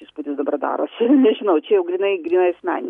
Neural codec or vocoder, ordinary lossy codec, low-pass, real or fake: none; MP3, 96 kbps; 10.8 kHz; real